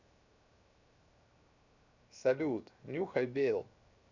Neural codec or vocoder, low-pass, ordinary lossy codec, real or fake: codec, 16 kHz, 0.7 kbps, FocalCodec; 7.2 kHz; none; fake